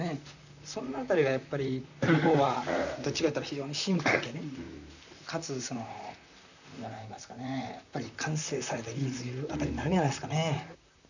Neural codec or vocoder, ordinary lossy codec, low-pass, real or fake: vocoder, 44.1 kHz, 128 mel bands, Pupu-Vocoder; none; 7.2 kHz; fake